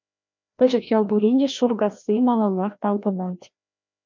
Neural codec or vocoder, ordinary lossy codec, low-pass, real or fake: codec, 16 kHz, 1 kbps, FreqCodec, larger model; MP3, 64 kbps; 7.2 kHz; fake